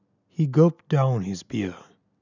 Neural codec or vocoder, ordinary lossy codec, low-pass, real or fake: none; none; 7.2 kHz; real